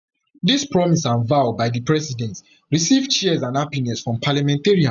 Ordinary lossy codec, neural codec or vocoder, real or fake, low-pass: none; none; real; 7.2 kHz